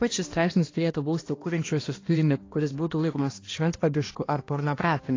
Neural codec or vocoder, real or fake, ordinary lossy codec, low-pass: codec, 16 kHz, 1 kbps, X-Codec, HuBERT features, trained on balanced general audio; fake; AAC, 32 kbps; 7.2 kHz